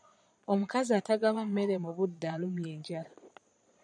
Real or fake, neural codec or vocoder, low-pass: fake; vocoder, 22.05 kHz, 80 mel bands, Vocos; 9.9 kHz